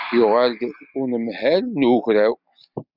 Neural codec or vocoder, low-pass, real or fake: none; 5.4 kHz; real